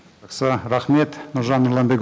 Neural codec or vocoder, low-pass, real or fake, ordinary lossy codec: none; none; real; none